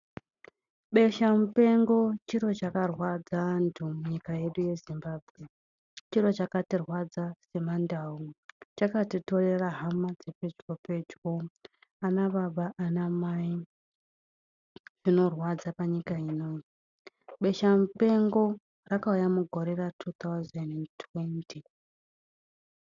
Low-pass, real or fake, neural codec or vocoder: 7.2 kHz; real; none